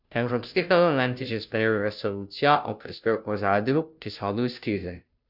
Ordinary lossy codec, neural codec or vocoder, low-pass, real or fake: none; codec, 16 kHz, 0.5 kbps, FunCodec, trained on Chinese and English, 25 frames a second; 5.4 kHz; fake